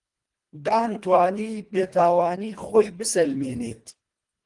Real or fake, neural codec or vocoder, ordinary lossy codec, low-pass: fake; codec, 24 kHz, 1.5 kbps, HILCodec; Opus, 24 kbps; 10.8 kHz